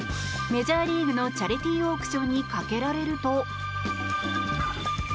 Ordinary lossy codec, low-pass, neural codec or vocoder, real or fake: none; none; none; real